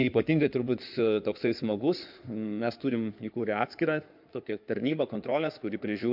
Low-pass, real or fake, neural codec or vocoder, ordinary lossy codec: 5.4 kHz; fake; codec, 16 kHz in and 24 kHz out, 2.2 kbps, FireRedTTS-2 codec; MP3, 48 kbps